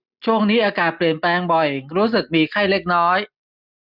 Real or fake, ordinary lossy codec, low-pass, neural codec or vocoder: real; none; 5.4 kHz; none